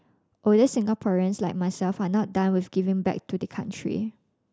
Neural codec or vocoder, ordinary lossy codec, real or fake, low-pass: none; none; real; none